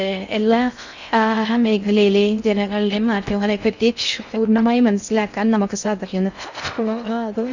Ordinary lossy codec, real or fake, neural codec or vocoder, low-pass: none; fake; codec, 16 kHz in and 24 kHz out, 0.6 kbps, FocalCodec, streaming, 4096 codes; 7.2 kHz